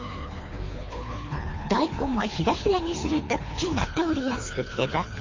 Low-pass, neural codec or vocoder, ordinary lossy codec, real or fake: 7.2 kHz; codec, 24 kHz, 3 kbps, HILCodec; MP3, 32 kbps; fake